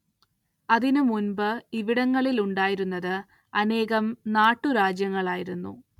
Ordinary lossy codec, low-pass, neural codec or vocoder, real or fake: none; 19.8 kHz; none; real